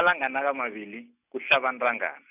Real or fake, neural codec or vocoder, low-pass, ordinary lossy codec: real; none; 3.6 kHz; none